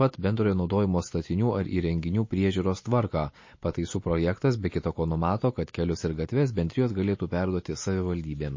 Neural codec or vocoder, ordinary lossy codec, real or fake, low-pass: none; MP3, 32 kbps; real; 7.2 kHz